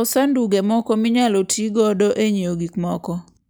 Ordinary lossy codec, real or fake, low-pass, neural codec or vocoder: none; real; none; none